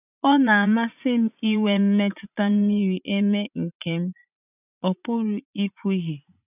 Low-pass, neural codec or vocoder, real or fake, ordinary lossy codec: 3.6 kHz; vocoder, 44.1 kHz, 80 mel bands, Vocos; fake; none